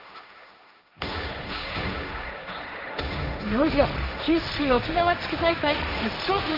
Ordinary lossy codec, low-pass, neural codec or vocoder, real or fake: none; 5.4 kHz; codec, 16 kHz, 1.1 kbps, Voila-Tokenizer; fake